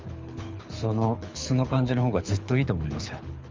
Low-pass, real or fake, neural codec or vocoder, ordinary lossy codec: 7.2 kHz; fake; codec, 24 kHz, 6 kbps, HILCodec; Opus, 32 kbps